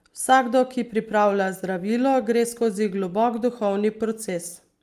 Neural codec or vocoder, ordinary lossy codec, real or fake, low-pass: none; Opus, 32 kbps; real; 14.4 kHz